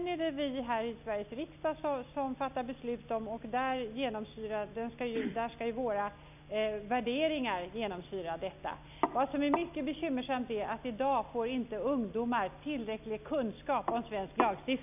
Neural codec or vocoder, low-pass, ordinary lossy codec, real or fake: none; 3.6 kHz; none; real